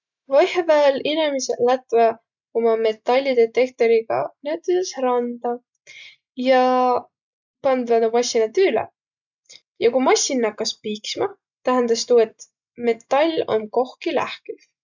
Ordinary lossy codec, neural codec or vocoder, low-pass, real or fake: none; none; 7.2 kHz; real